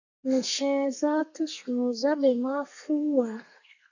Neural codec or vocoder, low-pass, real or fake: codec, 32 kHz, 1.9 kbps, SNAC; 7.2 kHz; fake